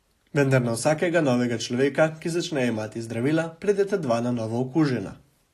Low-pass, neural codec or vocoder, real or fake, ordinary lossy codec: 14.4 kHz; none; real; AAC, 48 kbps